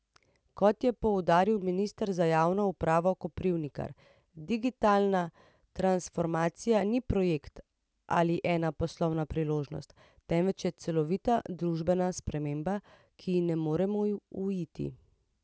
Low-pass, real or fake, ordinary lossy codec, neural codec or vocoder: none; real; none; none